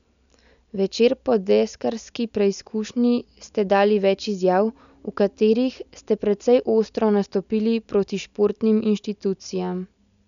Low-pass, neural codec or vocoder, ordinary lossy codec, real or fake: 7.2 kHz; none; none; real